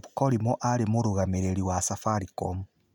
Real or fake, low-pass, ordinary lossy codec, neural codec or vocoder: real; 19.8 kHz; none; none